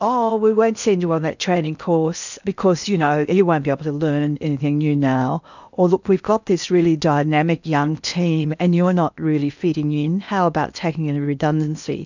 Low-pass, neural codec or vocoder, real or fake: 7.2 kHz; codec, 16 kHz in and 24 kHz out, 0.8 kbps, FocalCodec, streaming, 65536 codes; fake